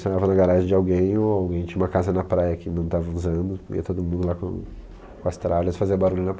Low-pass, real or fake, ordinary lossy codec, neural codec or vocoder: none; real; none; none